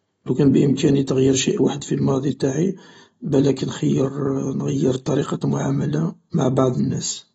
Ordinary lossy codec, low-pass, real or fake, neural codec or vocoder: AAC, 24 kbps; 19.8 kHz; real; none